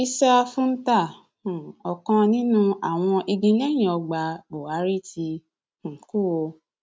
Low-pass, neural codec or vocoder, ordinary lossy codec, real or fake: none; none; none; real